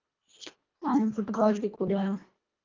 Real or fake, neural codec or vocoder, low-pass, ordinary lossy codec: fake; codec, 24 kHz, 1.5 kbps, HILCodec; 7.2 kHz; Opus, 24 kbps